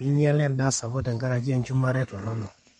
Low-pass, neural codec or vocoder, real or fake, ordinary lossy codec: 14.4 kHz; codec, 32 kHz, 1.9 kbps, SNAC; fake; MP3, 48 kbps